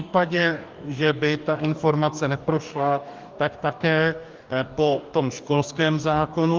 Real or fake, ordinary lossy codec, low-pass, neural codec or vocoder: fake; Opus, 32 kbps; 7.2 kHz; codec, 44.1 kHz, 2.6 kbps, DAC